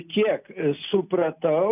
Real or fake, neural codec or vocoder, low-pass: real; none; 3.6 kHz